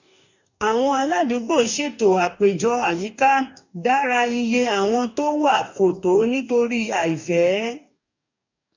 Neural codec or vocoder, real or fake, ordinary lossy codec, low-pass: codec, 44.1 kHz, 2.6 kbps, DAC; fake; none; 7.2 kHz